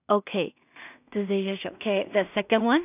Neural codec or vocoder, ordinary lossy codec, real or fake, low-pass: codec, 16 kHz in and 24 kHz out, 0.4 kbps, LongCat-Audio-Codec, two codebook decoder; none; fake; 3.6 kHz